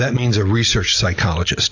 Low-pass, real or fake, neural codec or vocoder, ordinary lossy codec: 7.2 kHz; real; none; AAC, 48 kbps